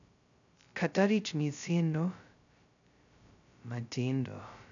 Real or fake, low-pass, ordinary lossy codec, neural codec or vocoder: fake; 7.2 kHz; none; codec, 16 kHz, 0.2 kbps, FocalCodec